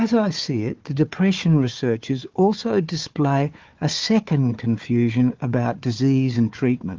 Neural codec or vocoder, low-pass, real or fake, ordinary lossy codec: codec, 16 kHz, 4 kbps, FunCodec, trained on Chinese and English, 50 frames a second; 7.2 kHz; fake; Opus, 32 kbps